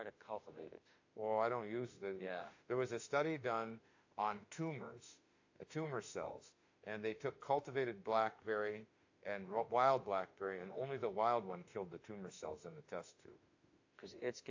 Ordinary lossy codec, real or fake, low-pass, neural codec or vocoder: AAC, 48 kbps; fake; 7.2 kHz; autoencoder, 48 kHz, 32 numbers a frame, DAC-VAE, trained on Japanese speech